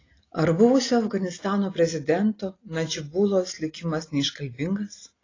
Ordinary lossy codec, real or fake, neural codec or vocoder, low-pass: AAC, 32 kbps; real; none; 7.2 kHz